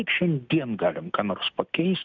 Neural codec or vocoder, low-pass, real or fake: none; 7.2 kHz; real